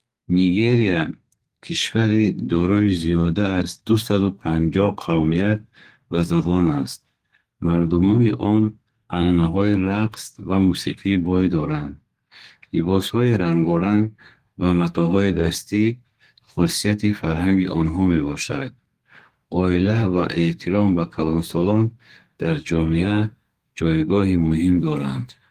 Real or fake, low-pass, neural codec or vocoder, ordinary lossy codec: fake; 14.4 kHz; codec, 32 kHz, 1.9 kbps, SNAC; Opus, 24 kbps